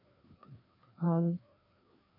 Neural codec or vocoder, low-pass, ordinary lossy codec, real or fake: codec, 16 kHz, 2 kbps, FreqCodec, larger model; 5.4 kHz; MP3, 32 kbps; fake